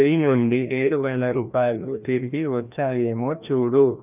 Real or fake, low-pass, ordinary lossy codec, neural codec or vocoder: fake; 3.6 kHz; none; codec, 16 kHz, 1 kbps, FreqCodec, larger model